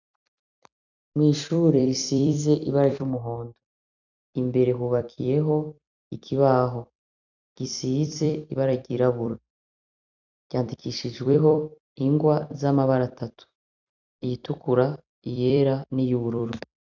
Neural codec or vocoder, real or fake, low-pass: vocoder, 44.1 kHz, 128 mel bands every 256 samples, BigVGAN v2; fake; 7.2 kHz